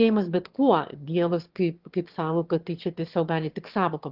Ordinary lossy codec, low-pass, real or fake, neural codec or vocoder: Opus, 16 kbps; 5.4 kHz; fake; autoencoder, 22.05 kHz, a latent of 192 numbers a frame, VITS, trained on one speaker